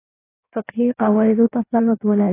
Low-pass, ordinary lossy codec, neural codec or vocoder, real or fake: 3.6 kHz; AAC, 24 kbps; codec, 16 kHz in and 24 kHz out, 1.1 kbps, FireRedTTS-2 codec; fake